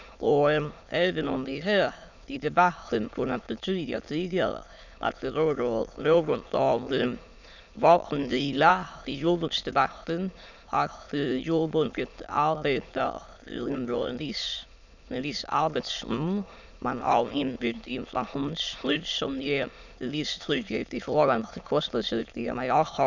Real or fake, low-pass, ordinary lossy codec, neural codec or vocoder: fake; 7.2 kHz; none; autoencoder, 22.05 kHz, a latent of 192 numbers a frame, VITS, trained on many speakers